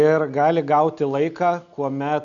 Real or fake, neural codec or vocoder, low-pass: real; none; 7.2 kHz